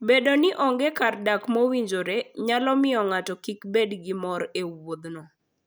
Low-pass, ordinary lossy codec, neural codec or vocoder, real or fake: none; none; none; real